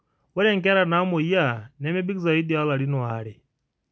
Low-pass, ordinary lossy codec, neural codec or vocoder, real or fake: none; none; none; real